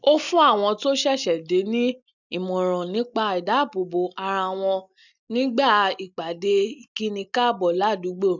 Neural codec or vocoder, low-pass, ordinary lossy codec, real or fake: none; 7.2 kHz; none; real